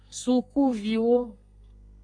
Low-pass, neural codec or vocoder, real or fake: 9.9 kHz; codec, 32 kHz, 1.9 kbps, SNAC; fake